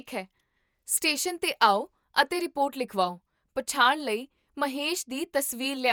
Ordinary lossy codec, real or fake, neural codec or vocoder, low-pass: none; fake; vocoder, 48 kHz, 128 mel bands, Vocos; none